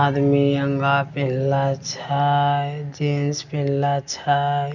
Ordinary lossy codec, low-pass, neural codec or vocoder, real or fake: none; 7.2 kHz; none; real